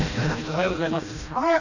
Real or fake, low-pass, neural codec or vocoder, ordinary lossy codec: fake; 7.2 kHz; codec, 16 kHz, 1 kbps, FreqCodec, smaller model; none